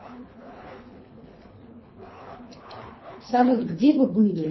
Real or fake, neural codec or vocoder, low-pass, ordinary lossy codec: fake; codec, 24 kHz, 3 kbps, HILCodec; 7.2 kHz; MP3, 24 kbps